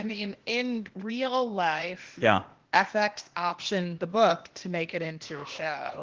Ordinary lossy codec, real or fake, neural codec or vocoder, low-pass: Opus, 16 kbps; fake; codec, 16 kHz, 0.8 kbps, ZipCodec; 7.2 kHz